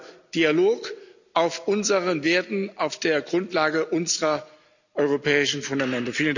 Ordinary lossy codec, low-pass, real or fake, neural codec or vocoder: none; 7.2 kHz; real; none